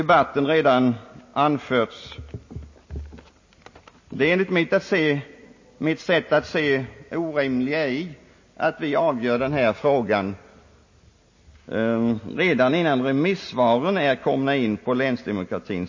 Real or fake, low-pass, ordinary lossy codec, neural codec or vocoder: real; 7.2 kHz; MP3, 32 kbps; none